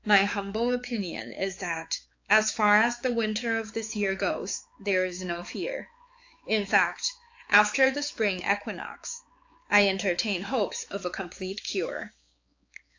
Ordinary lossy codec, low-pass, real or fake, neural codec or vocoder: AAC, 48 kbps; 7.2 kHz; fake; codec, 16 kHz, 4 kbps, X-Codec, HuBERT features, trained on balanced general audio